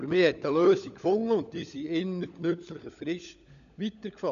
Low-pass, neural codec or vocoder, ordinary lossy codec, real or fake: 7.2 kHz; codec, 16 kHz, 16 kbps, FunCodec, trained on LibriTTS, 50 frames a second; none; fake